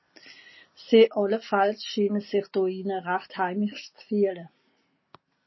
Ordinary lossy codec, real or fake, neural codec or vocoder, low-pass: MP3, 24 kbps; real; none; 7.2 kHz